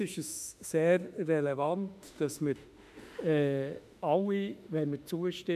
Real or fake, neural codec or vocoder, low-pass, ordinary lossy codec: fake; autoencoder, 48 kHz, 32 numbers a frame, DAC-VAE, trained on Japanese speech; 14.4 kHz; none